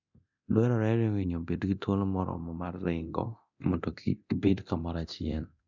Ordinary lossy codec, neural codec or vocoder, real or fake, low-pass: none; codec, 24 kHz, 0.9 kbps, DualCodec; fake; 7.2 kHz